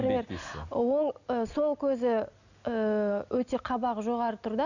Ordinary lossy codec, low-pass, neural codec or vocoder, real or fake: none; 7.2 kHz; none; real